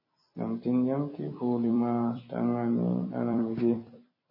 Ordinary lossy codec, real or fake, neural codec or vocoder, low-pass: MP3, 24 kbps; fake; vocoder, 44.1 kHz, 128 mel bands every 256 samples, BigVGAN v2; 5.4 kHz